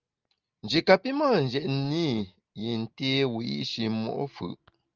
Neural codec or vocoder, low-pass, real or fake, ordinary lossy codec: none; 7.2 kHz; real; Opus, 24 kbps